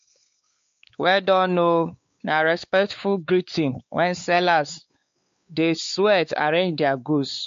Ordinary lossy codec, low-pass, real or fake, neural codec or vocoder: MP3, 48 kbps; 7.2 kHz; fake; codec, 16 kHz, 4 kbps, X-Codec, WavLM features, trained on Multilingual LibriSpeech